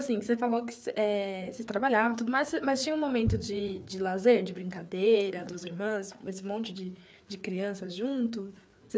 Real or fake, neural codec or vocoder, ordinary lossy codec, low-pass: fake; codec, 16 kHz, 4 kbps, FreqCodec, larger model; none; none